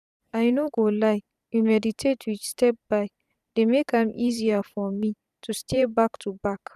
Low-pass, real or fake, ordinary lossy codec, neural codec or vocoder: 14.4 kHz; fake; none; vocoder, 44.1 kHz, 128 mel bands every 512 samples, BigVGAN v2